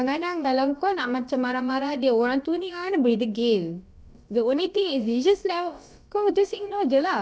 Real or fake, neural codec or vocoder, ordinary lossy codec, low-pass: fake; codec, 16 kHz, about 1 kbps, DyCAST, with the encoder's durations; none; none